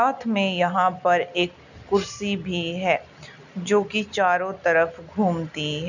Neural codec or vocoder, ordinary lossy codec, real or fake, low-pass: none; none; real; 7.2 kHz